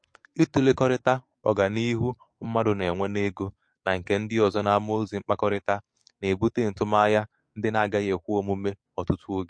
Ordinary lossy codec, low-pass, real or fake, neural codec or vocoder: MP3, 48 kbps; 9.9 kHz; fake; codec, 44.1 kHz, 7.8 kbps, DAC